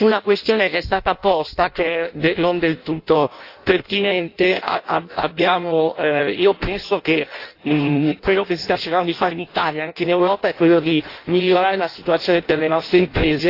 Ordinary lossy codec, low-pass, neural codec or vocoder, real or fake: AAC, 32 kbps; 5.4 kHz; codec, 16 kHz in and 24 kHz out, 0.6 kbps, FireRedTTS-2 codec; fake